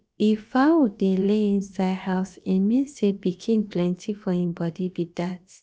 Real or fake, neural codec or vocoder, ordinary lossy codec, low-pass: fake; codec, 16 kHz, about 1 kbps, DyCAST, with the encoder's durations; none; none